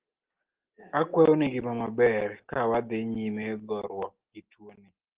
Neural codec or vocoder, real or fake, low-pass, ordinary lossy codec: none; real; 3.6 kHz; Opus, 16 kbps